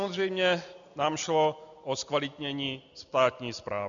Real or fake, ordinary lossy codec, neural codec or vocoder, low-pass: real; MP3, 96 kbps; none; 7.2 kHz